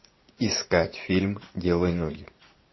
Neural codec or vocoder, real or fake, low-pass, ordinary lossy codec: vocoder, 44.1 kHz, 80 mel bands, Vocos; fake; 7.2 kHz; MP3, 24 kbps